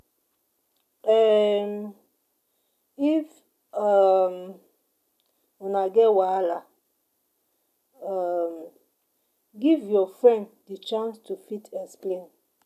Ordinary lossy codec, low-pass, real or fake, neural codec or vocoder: none; 14.4 kHz; real; none